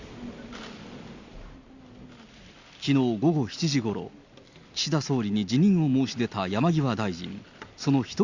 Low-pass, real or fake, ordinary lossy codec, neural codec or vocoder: 7.2 kHz; real; Opus, 64 kbps; none